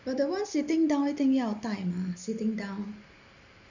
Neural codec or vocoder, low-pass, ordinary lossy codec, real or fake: none; 7.2 kHz; none; real